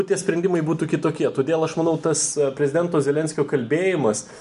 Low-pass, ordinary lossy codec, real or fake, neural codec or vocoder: 10.8 kHz; MP3, 96 kbps; real; none